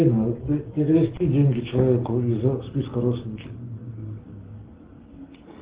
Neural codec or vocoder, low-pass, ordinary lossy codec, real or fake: none; 3.6 kHz; Opus, 16 kbps; real